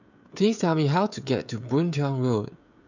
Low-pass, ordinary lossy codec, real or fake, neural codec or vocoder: 7.2 kHz; none; fake; codec, 16 kHz, 16 kbps, FreqCodec, smaller model